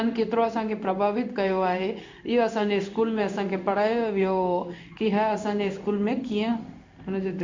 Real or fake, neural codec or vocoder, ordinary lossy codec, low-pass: fake; codec, 16 kHz in and 24 kHz out, 1 kbps, XY-Tokenizer; MP3, 64 kbps; 7.2 kHz